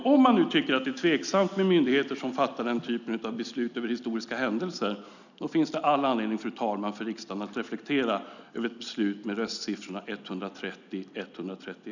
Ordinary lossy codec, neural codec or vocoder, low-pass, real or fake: none; none; 7.2 kHz; real